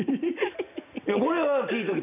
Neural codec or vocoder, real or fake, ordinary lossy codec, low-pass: none; real; none; 3.6 kHz